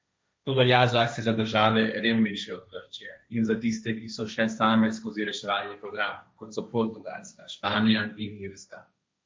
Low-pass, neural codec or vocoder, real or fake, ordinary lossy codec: none; codec, 16 kHz, 1.1 kbps, Voila-Tokenizer; fake; none